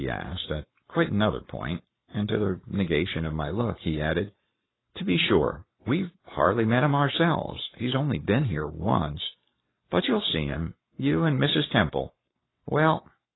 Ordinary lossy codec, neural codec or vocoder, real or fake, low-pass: AAC, 16 kbps; codec, 44.1 kHz, 7.8 kbps, Pupu-Codec; fake; 7.2 kHz